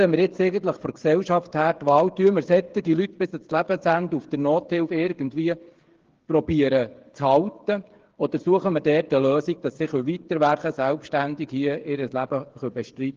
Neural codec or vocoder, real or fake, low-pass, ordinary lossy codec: codec, 16 kHz, 8 kbps, FreqCodec, smaller model; fake; 7.2 kHz; Opus, 16 kbps